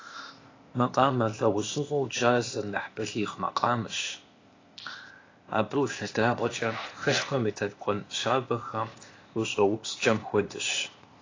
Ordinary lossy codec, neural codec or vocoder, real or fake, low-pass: AAC, 32 kbps; codec, 16 kHz, 0.8 kbps, ZipCodec; fake; 7.2 kHz